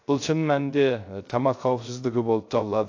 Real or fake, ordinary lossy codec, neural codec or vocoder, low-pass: fake; AAC, 48 kbps; codec, 16 kHz, 0.3 kbps, FocalCodec; 7.2 kHz